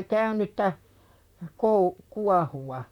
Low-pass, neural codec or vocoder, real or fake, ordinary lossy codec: 19.8 kHz; vocoder, 44.1 kHz, 128 mel bands, Pupu-Vocoder; fake; none